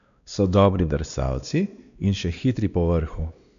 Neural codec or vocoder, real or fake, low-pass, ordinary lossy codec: codec, 16 kHz, 2 kbps, X-Codec, WavLM features, trained on Multilingual LibriSpeech; fake; 7.2 kHz; none